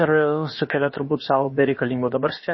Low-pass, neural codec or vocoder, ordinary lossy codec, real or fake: 7.2 kHz; codec, 16 kHz, about 1 kbps, DyCAST, with the encoder's durations; MP3, 24 kbps; fake